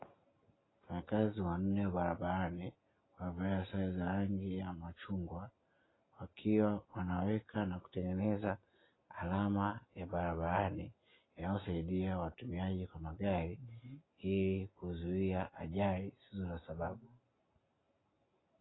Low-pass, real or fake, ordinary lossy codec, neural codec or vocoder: 7.2 kHz; real; AAC, 16 kbps; none